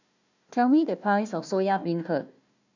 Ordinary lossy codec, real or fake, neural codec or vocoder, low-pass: none; fake; codec, 16 kHz, 1 kbps, FunCodec, trained on Chinese and English, 50 frames a second; 7.2 kHz